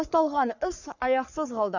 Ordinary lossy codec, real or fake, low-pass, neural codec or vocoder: none; fake; 7.2 kHz; codec, 44.1 kHz, 3.4 kbps, Pupu-Codec